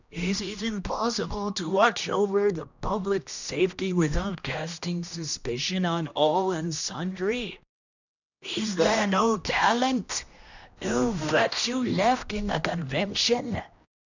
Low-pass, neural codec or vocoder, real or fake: 7.2 kHz; codec, 16 kHz, 1 kbps, X-Codec, HuBERT features, trained on general audio; fake